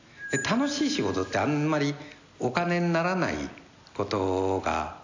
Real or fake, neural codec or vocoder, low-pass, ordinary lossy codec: real; none; 7.2 kHz; AAC, 48 kbps